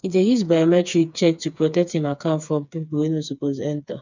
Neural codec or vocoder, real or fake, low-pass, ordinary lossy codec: codec, 16 kHz, 4 kbps, FreqCodec, smaller model; fake; 7.2 kHz; none